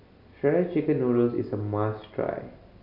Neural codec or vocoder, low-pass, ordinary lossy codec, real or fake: none; 5.4 kHz; none; real